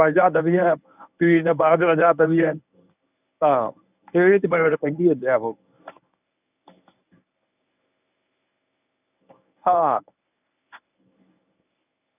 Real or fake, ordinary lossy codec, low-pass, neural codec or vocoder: fake; none; 3.6 kHz; codec, 24 kHz, 0.9 kbps, WavTokenizer, medium speech release version 1